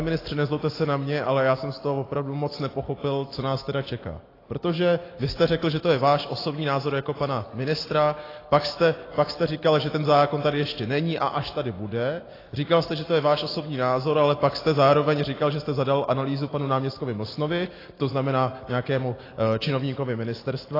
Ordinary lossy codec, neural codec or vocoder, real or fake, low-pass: AAC, 24 kbps; none; real; 5.4 kHz